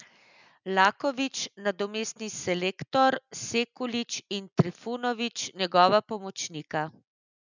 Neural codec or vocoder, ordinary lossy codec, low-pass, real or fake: none; none; 7.2 kHz; real